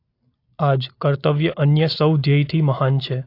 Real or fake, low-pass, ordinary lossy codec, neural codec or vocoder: real; 5.4 kHz; AAC, 32 kbps; none